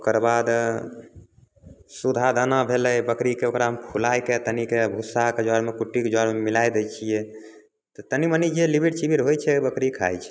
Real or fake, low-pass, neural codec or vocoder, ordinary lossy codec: real; none; none; none